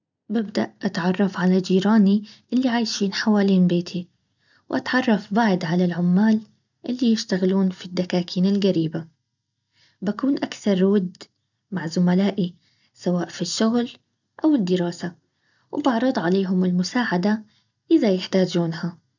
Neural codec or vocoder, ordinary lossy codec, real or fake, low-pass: none; none; real; 7.2 kHz